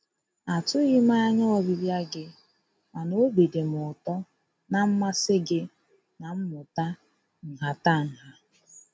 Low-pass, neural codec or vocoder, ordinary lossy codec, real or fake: none; none; none; real